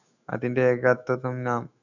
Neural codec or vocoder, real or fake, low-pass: autoencoder, 48 kHz, 128 numbers a frame, DAC-VAE, trained on Japanese speech; fake; 7.2 kHz